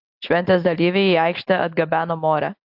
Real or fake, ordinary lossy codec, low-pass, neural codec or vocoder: real; AAC, 48 kbps; 5.4 kHz; none